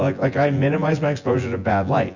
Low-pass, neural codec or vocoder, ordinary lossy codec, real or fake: 7.2 kHz; vocoder, 24 kHz, 100 mel bands, Vocos; Opus, 64 kbps; fake